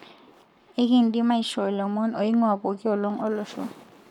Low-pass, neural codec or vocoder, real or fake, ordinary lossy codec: 19.8 kHz; codec, 44.1 kHz, 7.8 kbps, Pupu-Codec; fake; none